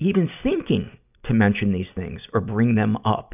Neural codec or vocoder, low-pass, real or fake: none; 3.6 kHz; real